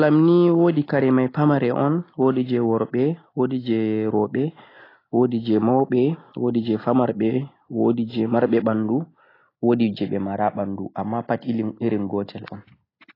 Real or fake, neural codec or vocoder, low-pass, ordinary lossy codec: real; none; 5.4 kHz; AAC, 24 kbps